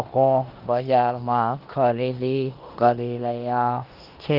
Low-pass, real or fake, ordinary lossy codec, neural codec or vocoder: 5.4 kHz; fake; Opus, 16 kbps; codec, 16 kHz in and 24 kHz out, 0.9 kbps, LongCat-Audio-Codec, four codebook decoder